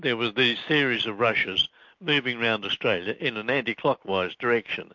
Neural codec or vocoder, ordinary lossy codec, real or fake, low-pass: none; MP3, 48 kbps; real; 7.2 kHz